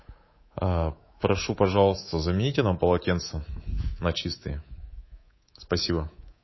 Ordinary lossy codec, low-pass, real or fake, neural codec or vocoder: MP3, 24 kbps; 7.2 kHz; fake; codec, 24 kHz, 3.1 kbps, DualCodec